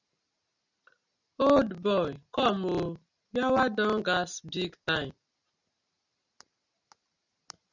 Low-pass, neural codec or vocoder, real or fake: 7.2 kHz; none; real